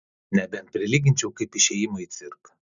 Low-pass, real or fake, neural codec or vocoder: 7.2 kHz; real; none